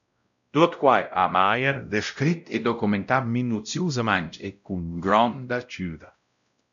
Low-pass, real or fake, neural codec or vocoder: 7.2 kHz; fake; codec, 16 kHz, 0.5 kbps, X-Codec, WavLM features, trained on Multilingual LibriSpeech